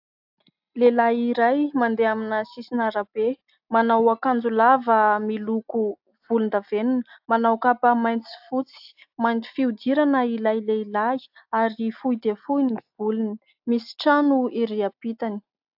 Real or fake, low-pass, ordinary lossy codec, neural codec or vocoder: real; 5.4 kHz; AAC, 48 kbps; none